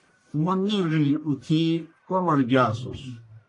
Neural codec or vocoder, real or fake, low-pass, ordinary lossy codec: codec, 44.1 kHz, 1.7 kbps, Pupu-Codec; fake; 10.8 kHz; AAC, 48 kbps